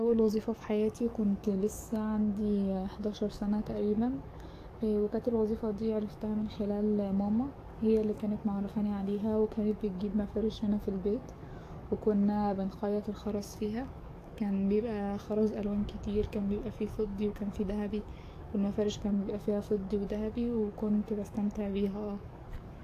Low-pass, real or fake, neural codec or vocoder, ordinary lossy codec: 14.4 kHz; fake; codec, 44.1 kHz, 7.8 kbps, DAC; AAC, 64 kbps